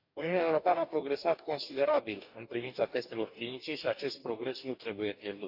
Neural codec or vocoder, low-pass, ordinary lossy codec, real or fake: codec, 44.1 kHz, 2.6 kbps, DAC; 5.4 kHz; none; fake